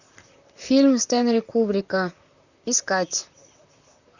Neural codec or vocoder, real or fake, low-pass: vocoder, 44.1 kHz, 128 mel bands, Pupu-Vocoder; fake; 7.2 kHz